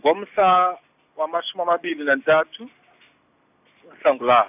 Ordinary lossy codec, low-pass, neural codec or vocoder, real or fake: none; 3.6 kHz; none; real